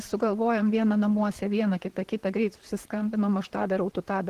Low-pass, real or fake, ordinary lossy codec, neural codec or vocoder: 14.4 kHz; fake; Opus, 16 kbps; vocoder, 44.1 kHz, 128 mel bands, Pupu-Vocoder